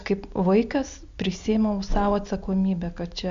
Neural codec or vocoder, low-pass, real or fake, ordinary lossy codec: none; 7.2 kHz; real; MP3, 64 kbps